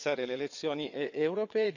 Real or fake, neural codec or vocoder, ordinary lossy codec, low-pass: fake; vocoder, 22.05 kHz, 80 mel bands, WaveNeXt; none; 7.2 kHz